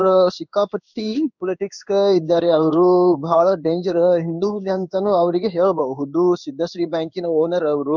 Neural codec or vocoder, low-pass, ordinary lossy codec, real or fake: codec, 16 kHz in and 24 kHz out, 1 kbps, XY-Tokenizer; 7.2 kHz; none; fake